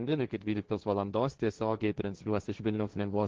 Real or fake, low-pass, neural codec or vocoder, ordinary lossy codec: fake; 7.2 kHz; codec, 16 kHz, 1.1 kbps, Voila-Tokenizer; Opus, 16 kbps